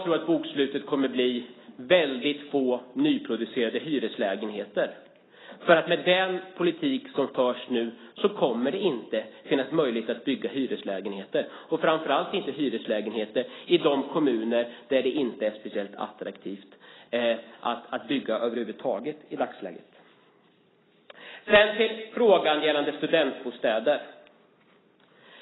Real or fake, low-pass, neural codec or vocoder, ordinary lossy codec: real; 7.2 kHz; none; AAC, 16 kbps